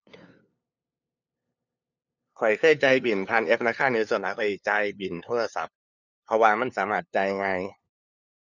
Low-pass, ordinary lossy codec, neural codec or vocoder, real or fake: 7.2 kHz; none; codec, 16 kHz, 2 kbps, FunCodec, trained on LibriTTS, 25 frames a second; fake